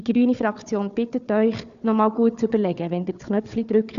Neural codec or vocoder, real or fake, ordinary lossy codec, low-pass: codec, 16 kHz, 4 kbps, FunCodec, trained on Chinese and English, 50 frames a second; fake; Opus, 64 kbps; 7.2 kHz